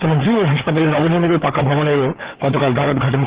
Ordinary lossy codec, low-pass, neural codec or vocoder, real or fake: Opus, 16 kbps; 3.6 kHz; codec, 16 kHz, 4 kbps, FreqCodec, larger model; fake